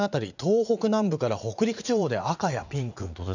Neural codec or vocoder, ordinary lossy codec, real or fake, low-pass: vocoder, 44.1 kHz, 80 mel bands, Vocos; none; fake; 7.2 kHz